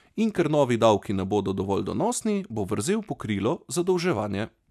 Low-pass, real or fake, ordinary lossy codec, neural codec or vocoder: 14.4 kHz; real; none; none